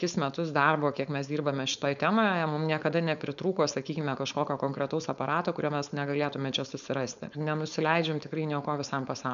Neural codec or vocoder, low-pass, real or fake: codec, 16 kHz, 4.8 kbps, FACodec; 7.2 kHz; fake